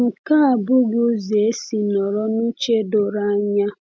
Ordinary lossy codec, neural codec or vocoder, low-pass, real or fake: none; none; none; real